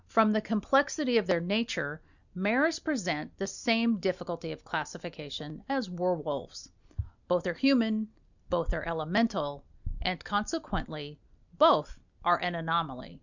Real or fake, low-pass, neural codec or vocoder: real; 7.2 kHz; none